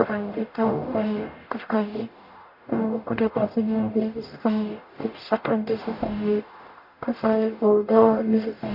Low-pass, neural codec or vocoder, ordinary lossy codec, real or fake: 5.4 kHz; codec, 44.1 kHz, 0.9 kbps, DAC; none; fake